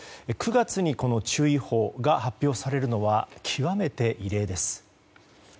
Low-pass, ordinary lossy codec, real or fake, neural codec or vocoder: none; none; real; none